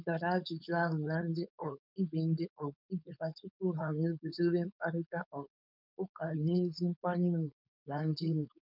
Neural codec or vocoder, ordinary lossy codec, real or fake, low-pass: codec, 16 kHz, 4.8 kbps, FACodec; none; fake; 5.4 kHz